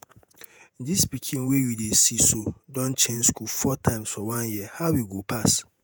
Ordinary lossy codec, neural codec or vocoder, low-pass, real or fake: none; vocoder, 48 kHz, 128 mel bands, Vocos; none; fake